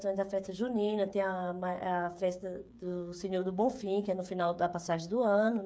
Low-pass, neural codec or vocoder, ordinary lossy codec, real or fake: none; codec, 16 kHz, 8 kbps, FreqCodec, smaller model; none; fake